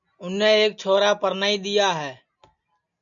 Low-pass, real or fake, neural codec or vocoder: 7.2 kHz; real; none